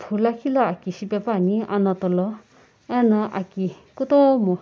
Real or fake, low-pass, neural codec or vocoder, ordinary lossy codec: real; none; none; none